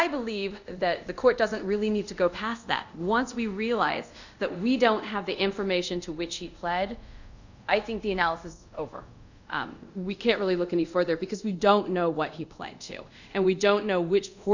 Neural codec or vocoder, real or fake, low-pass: codec, 24 kHz, 0.5 kbps, DualCodec; fake; 7.2 kHz